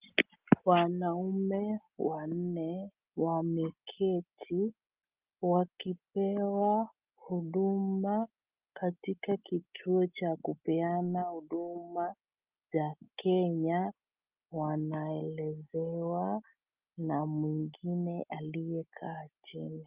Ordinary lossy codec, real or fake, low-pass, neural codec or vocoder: Opus, 24 kbps; real; 3.6 kHz; none